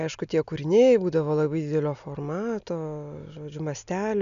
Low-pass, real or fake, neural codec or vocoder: 7.2 kHz; real; none